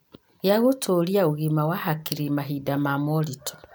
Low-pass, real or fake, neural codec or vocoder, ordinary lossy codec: none; real; none; none